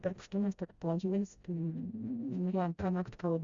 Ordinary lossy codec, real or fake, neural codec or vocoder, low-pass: Opus, 64 kbps; fake; codec, 16 kHz, 0.5 kbps, FreqCodec, smaller model; 7.2 kHz